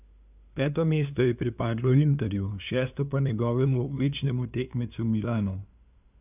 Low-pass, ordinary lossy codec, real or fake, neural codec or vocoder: 3.6 kHz; none; fake; codec, 16 kHz, 2 kbps, FunCodec, trained on LibriTTS, 25 frames a second